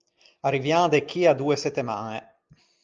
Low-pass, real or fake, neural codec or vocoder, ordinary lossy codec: 7.2 kHz; real; none; Opus, 24 kbps